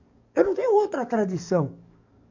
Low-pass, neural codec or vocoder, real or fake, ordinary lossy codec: 7.2 kHz; codec, 44.1 kHz, 7.8 kbps, DAC; fake; none